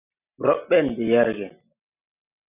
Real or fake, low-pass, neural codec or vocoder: real; 3.6 kHz; none